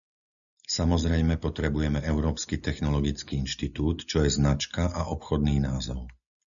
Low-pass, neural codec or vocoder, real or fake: 7.2 kHz; none; real